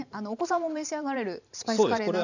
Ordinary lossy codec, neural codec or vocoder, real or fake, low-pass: none; vocoder, 44.1 kHz, 128 mel bands every 512 samples, BigVGAN v2; fake; 7.2 kHz